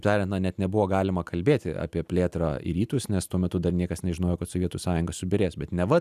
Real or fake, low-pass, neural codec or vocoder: real; 14.4 kHz; none